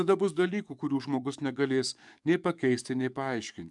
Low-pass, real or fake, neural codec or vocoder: 10.8 kHz; fake; codec, 44.1 kHz, 7.8 kbps, DAC